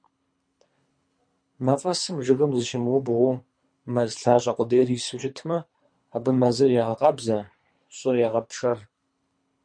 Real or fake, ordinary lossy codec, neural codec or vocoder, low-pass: fake; MP3, 48 kbps; codec, 24 kHz, 3 kbps, HILCodec; 9.9 kHz